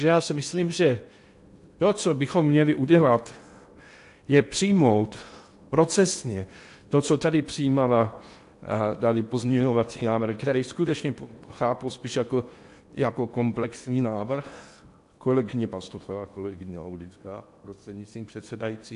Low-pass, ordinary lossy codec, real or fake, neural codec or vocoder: 10.8 kHz; AAC, 64 kbps; fake; codec, 16 kHz in and 24 kHz out, 0.8 kbps, FocalCodec, streaming, 65536 codes